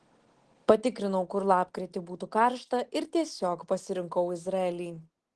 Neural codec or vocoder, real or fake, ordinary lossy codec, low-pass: none; real; Opus, 16 kbps; 10.8 kHz